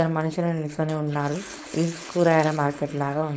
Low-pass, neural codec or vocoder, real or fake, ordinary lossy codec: none; codec, 16 kHz, 4.8 kbps, FACodec; fake; none